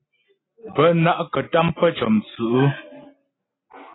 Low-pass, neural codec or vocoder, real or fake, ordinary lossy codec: 7.2 kHz; none; real; AAC, 16 kbps